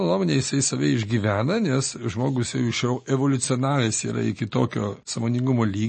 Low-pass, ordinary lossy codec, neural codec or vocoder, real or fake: 10.8 kHz; MP3, 32 kbps; none; real